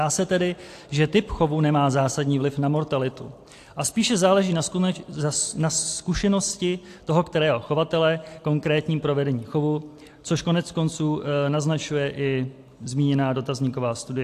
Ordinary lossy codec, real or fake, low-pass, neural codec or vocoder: AAC, 64 kbps; real; 14.4 kHz; none